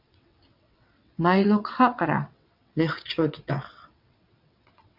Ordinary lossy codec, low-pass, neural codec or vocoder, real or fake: MP3, 48 kbps; 5.4 kHz; vocoder, 22.05 kHz, 80 mel bands, WaveNeXt; fake